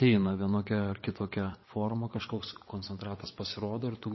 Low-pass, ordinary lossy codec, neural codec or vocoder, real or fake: 7.2 kHz; MP3, 24 kbps; vocoder, 22.05 kHz, 80 mel bands, WaveNeXt; fake